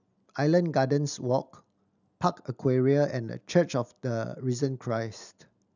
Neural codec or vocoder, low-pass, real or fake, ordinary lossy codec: none; 7.2 kHz; real; none